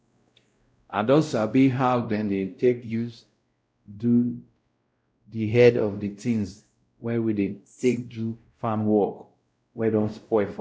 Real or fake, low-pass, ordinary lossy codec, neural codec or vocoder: fake; none; none; codec, 16 kHz, 0.5 kbps, X-Codec, WavLM features, trained on Multilingual LibriSpeech